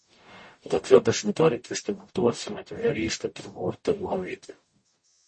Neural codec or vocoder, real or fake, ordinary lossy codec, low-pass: codec, 44.1 kHz, 0.9 kbps, DAC; fake; MP3, 32 kbps; 10.8 kHz